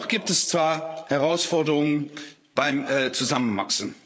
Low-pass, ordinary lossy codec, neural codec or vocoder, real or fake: none; none; codec, 16 kHz, 8 kbps, FreqCodec, larger model; fake